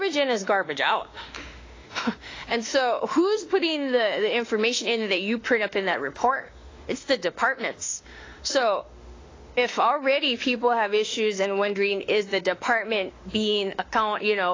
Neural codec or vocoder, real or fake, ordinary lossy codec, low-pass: autoencoder, 48 kHz, 32 numbers a frame, DAC-VAE, trained on Japanese speech; fake; AAC, 32 kbps; 7.2 kHz